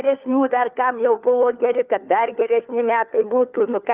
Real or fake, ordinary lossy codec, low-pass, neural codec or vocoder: fake; Opus, 24 kbps; 3.6 kHz; codec, 16 kHz, 4 kbps, FunCodec, trained on LibriTTS, 50 frames a second